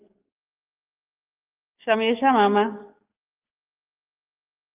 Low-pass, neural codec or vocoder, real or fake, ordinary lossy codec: 3.6 kHz; codec, 44.1 kHz, 7.8 kbps, DAC; fake; Opus, 32 kbps